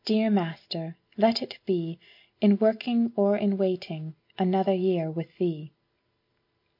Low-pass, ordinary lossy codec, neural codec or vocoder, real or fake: 5.4 kHz; MP3, 32 kbps; none; real